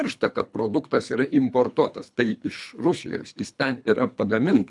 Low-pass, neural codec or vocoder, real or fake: 10.8 kHz; codec, 24 kHz, 3 kbps, HILCodec; fake